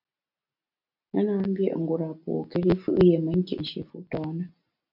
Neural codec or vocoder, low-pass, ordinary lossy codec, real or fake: none; 5.4 kHz; MP3, 48 kbps; real